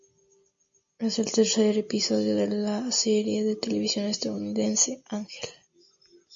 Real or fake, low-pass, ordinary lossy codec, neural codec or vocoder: real; 7.2 kHz; MP3, 48 kbps; none